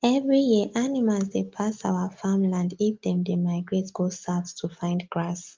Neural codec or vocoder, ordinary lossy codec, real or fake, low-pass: none; Opus, 32 kbps; real; 7.2 kHz